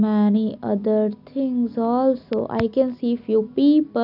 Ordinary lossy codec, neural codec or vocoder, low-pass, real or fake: none; none; 5.4 kHz; real